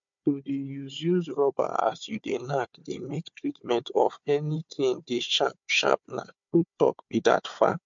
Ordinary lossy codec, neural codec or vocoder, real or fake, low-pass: MP3, 48 kbps; codec, 16 kHz, 4 kbps, FunCodec, trained on Chinese and English, 50 frames a second; fake; 7.2 kHz